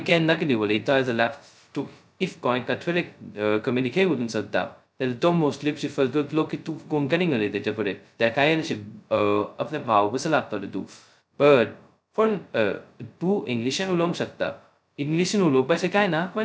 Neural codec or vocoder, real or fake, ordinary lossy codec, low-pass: codec, 16 kHz, 0.2 kbps, FocalCodec; fake; none; none